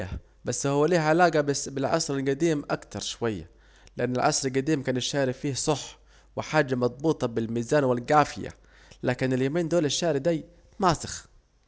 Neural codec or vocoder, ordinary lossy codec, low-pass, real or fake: none; none; none; real